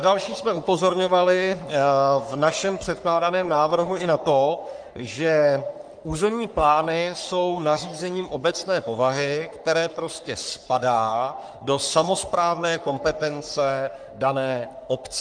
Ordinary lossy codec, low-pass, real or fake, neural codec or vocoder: Opus, 32 kbps; 9.9 kHz; fake; codec, 44.1 kHz, 3.4 kbps, Pupu-Codec